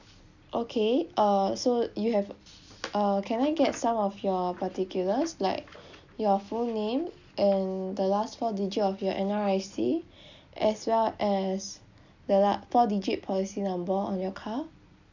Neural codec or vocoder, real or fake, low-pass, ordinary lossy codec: none; real; 7.2 kHz; none